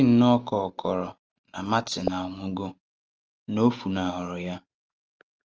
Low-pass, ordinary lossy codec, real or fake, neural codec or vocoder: 7.2 kHz; Opus, 24 kbps; real; none